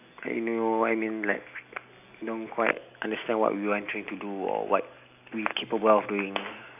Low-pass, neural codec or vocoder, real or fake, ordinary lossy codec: 3.6 kHz; none; real; none